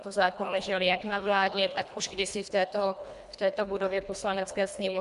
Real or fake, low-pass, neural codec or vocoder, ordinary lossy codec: fake; 10.8 kHz; codec, 24 kHz, 1.5 kbps, HILCodec; MP3, 96 kbps